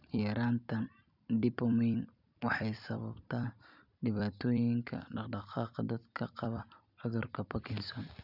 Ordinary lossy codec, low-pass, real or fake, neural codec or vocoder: none; 5.4 kHz; fake; vocoder, 44.1 kHz, 128 mel bands every 256 samples, BigVGAN v2